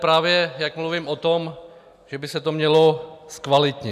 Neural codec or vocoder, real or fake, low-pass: none; real; 14.4 kHz